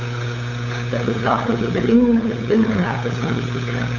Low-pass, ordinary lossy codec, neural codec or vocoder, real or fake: 7.2 kHz; none; codec, 16 kHz, 8 kbps, FunCodec, trained on LibriTTS, 25 frames a second; fake